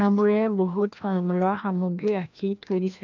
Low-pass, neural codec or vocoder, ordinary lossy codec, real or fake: 7.2 kHz; codec, 16 kHz, 1 kbps, FreqCodec, larger model; none; fake